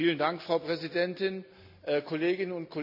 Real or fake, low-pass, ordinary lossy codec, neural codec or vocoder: real; 5.4 kHz; none; none